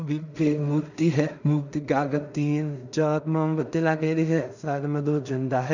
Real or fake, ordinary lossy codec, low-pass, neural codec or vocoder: fake; none; 7.2 kHz; codec, 16 kHz in and 24 kHz out, 0.4 kbps, LongCat-Audio-Codec, two codebook decoder